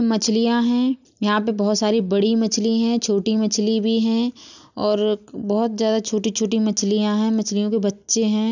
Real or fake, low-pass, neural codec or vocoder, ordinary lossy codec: real; 7.2 kHz; none; none